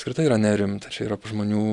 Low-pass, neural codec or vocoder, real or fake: 10.8 kHz; none; real